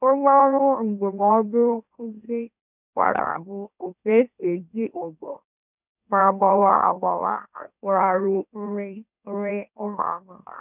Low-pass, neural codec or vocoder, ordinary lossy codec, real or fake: 3.6 kHz; autoencoder, 44.1 kHz, a latent of 192 numbers a frame, MeloTTS; none; fake